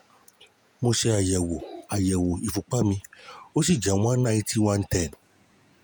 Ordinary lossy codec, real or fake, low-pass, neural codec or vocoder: none; fake; none; vocoder, 48 kHz, 128 mel bands, Vocos